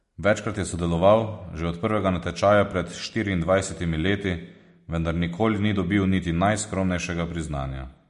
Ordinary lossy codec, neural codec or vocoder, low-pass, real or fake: MP3, 48 kbps; none; 14.4 kHz; real